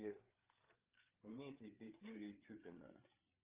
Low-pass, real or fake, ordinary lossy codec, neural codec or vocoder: 3.6 kHz; fake; Opus, 32 kbps; codec, 16 kHz, 8 kbps, FreqCodec, larger model